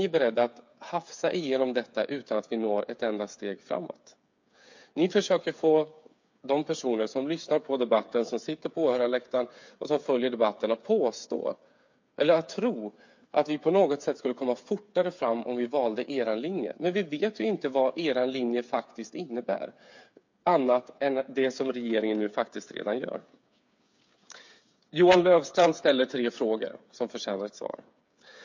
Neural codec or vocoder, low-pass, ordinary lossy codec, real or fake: codec, 16 kHz, 8 kbps, FreqCodec, smaller model; 7.2 kHz; MP3, 48 kbps; fake